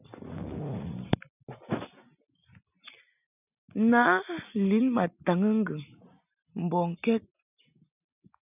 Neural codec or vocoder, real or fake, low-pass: none; real; 3.6 kHz